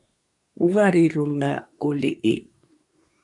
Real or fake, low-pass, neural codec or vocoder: fake; 10.8 kHz; codec, 24 kHz, 1 kbps, SNAC